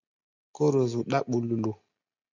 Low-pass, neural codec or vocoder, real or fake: 7.2 kHz; none; real